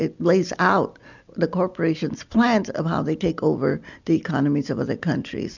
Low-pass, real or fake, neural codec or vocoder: 7.2 kHz; real; none